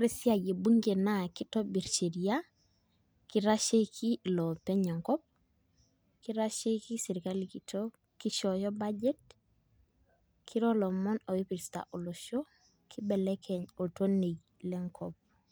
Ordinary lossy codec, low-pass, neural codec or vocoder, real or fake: none; none; none; real